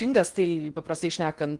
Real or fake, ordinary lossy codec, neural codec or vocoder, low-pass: fake; Opus, 24 kbps; codec, 16 kHz in and 24 kHz out, 0.8 kbps, FocalCodec, streaming, 65536 codes; 10.8 kHz